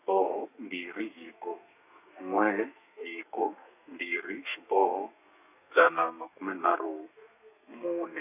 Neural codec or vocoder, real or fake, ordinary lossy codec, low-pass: codec, 32 kHz, 1.9 kbps, SNAC; fake; none; 3.6 kHz